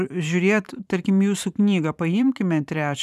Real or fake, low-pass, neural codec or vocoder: fake; 14.4 kHz; vocoder, 44.1 kHz, 128 mel bands every 512 samples, BigVGAN v2